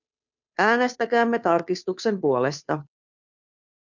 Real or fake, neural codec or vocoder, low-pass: fake; codec, 16 kHz, 2 kbps, FunCodec, trained on Chinese and English, 25 frames a second; 7.2 kHz